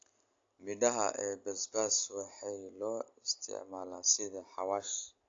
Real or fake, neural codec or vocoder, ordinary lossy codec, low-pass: real; none; AAC, 48 kbps; 7.2 kHz